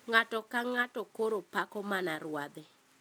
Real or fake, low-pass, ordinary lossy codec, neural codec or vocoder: real; none; none; none